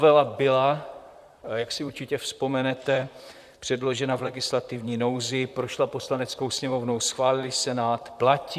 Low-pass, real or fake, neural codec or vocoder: 14.4 kHz; fake; vocoder, 44.1 kHz, 128 mel bands, Pupu-Vocoder